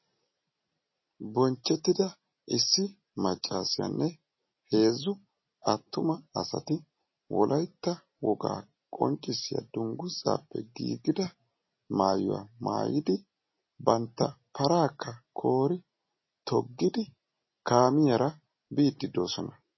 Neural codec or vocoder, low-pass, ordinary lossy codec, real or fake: none; 7.2 kHz; MP3, 24 kbps; real